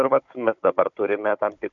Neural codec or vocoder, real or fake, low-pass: codec, 16 kHz, 16 kbps, FunCodec, trained on Chinese and English, 50 frames a second; fake; 7.2 kHz